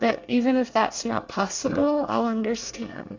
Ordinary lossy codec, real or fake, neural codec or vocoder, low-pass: AAC, 48 kbps; fake; codec, 24 kHz, 1 kbps, SNAC; 7.2 kHz